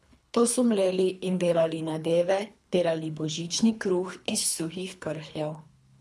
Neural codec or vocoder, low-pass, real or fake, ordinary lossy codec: codec, 24 kHz, 3 kbps, HILCodec; none; fake; none